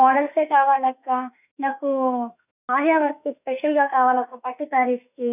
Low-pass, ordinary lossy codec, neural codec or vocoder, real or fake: 3.6 kHz; none; autoencoder, 48 kHz, 32 numbers a frame, DAC-VAE, trained on Japanese speech; fake